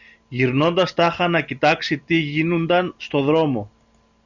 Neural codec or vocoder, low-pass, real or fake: none; 7.2 kHz; real